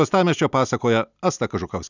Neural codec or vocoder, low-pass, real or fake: none; 7.2 kHz; real